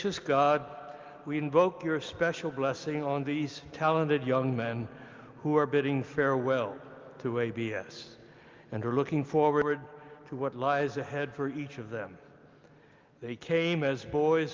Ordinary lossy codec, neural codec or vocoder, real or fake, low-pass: Opus, 32 kbps; none; real; 7.2 kHz